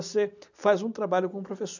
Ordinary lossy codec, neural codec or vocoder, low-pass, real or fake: none; none; 7.2 kHz; real